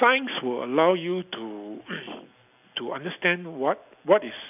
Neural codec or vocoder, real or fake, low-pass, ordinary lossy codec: none; real; 3.6 kHz; none